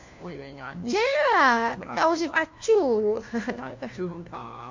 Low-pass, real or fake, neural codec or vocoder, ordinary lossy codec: 7.2 kHz; fake; codec, 16 kHz, 1 kbps, FunCodec, trained on LibriTTS, 50 frames a second; MP3, 48 kbps